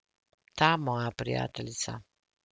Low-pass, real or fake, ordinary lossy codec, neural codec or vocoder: none; real; none; none